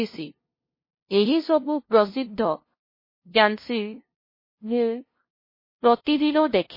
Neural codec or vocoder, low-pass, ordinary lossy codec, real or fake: codec, 16 kHz, 0.5 kbps, FunCodec, trained on LibriTTS, 25 frames a second; 5.4 kHz; MP3, 24 kbps; fake